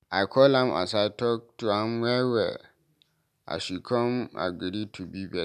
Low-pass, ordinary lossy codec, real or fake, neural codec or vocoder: 14.4 kHz; none; real; none